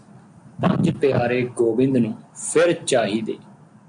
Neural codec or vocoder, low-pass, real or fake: none; 9.9 kHz; real